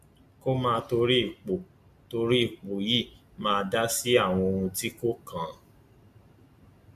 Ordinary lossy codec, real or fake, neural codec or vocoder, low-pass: AAC, 96 kbps; real; none; 14.4 kHz